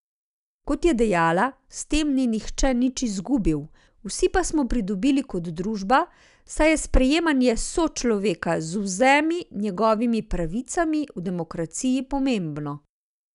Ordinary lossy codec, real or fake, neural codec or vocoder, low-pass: none; real; none; 10.8 kHz